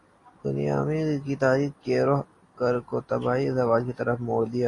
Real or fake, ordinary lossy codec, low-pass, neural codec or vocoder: real; AAC, 32 kbps; 10.8 kHz; none